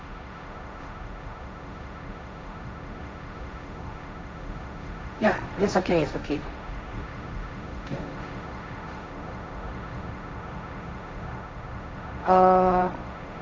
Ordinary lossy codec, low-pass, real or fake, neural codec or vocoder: none; none; fake; codec, 16 kHz, 1.1 kbps, Voila-Tokenizer